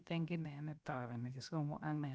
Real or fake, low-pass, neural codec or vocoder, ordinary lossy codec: fake; none; codec, 16 kHz, 0.7 kbps, FocalCodec; none